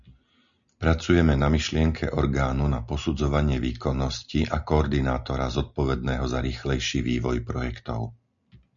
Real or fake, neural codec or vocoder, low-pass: real; none; 7.2 kHz